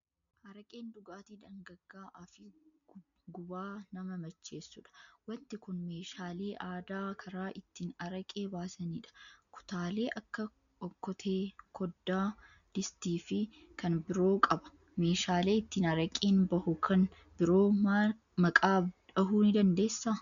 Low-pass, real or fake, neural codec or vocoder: 7.2 kHz; real; none